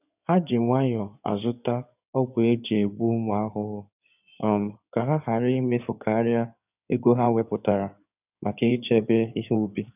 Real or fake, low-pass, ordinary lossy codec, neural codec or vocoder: fake; 3.6 kHz; none; codec, 16 kHz in and 24 kHz out, 2.2 kbps, FireRedTTS-2 codec